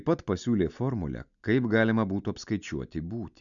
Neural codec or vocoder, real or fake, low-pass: none; real; 7.2 kHz